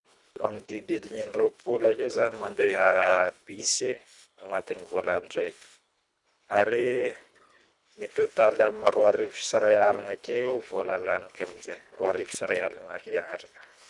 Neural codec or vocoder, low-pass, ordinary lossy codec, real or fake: codec, 24 kHz, 1.5 kbps, HILCodec; 10.8 kHz; none; fake